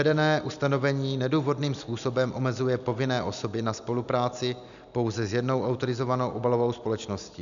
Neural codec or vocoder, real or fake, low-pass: none; real; 7.2 kHz